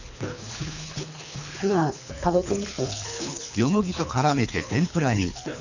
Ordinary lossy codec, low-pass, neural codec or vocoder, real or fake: none; 7.2 kHz; codec, 24 kHz, 3 kbps, HILCodec; fake